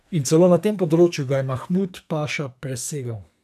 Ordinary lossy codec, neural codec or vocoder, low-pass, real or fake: none; codec, 44.1 kHz, 2.6 kbps, SNAC; 14.4 kHz; fake